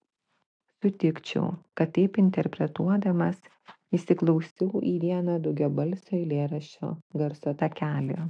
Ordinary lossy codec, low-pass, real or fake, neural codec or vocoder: AAC, 64 kbps; 9.9 kHz; real; none